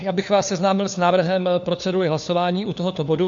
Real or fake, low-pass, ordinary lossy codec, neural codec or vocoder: fake; 7.2 kHz; MP3, 64 kbps; codec, 16 kHz, 4 kbps, FunCodec, trained on LibriTTS, 50 frames a second